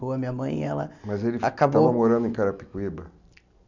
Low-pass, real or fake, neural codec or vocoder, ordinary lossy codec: 7.2 kHz; real; none; none